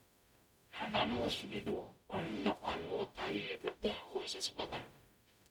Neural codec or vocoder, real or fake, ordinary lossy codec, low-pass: codec, 44.1 kHz, 0.9 kbps, DAC; fake; none; 19.8 kHz